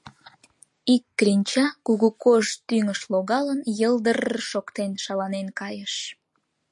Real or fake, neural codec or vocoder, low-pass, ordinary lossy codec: real; none; 10.8 kHz; MP3, 48 kbps